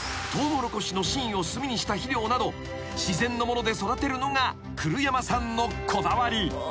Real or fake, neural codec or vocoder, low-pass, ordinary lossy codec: real; none; none; none